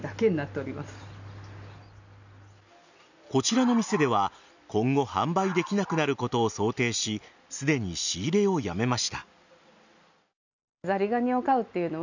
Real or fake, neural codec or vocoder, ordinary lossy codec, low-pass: real; none; none; 7.2 kHz